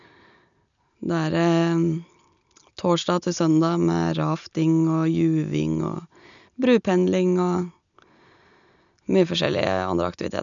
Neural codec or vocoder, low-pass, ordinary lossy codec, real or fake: none; 7.2 kHz; none; real